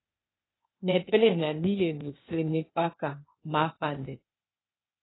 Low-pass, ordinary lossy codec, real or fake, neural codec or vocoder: 7.2 kHz; AAC, 16 kbps; fake; codec, 16 kHz, 0.8 kbps, ZipCodec